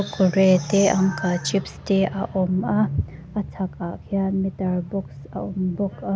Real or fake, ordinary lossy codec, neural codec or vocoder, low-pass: real; none; none; none